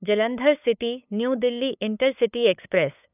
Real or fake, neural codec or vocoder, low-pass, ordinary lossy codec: fake; codec, 16 kHz, 8 kbps, FunCodec, trained on LibriTTS, 25 frames a second; 3.6 kHz; none